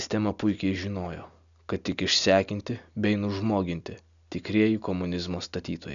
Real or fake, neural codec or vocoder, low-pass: real; none; 7.2 kHz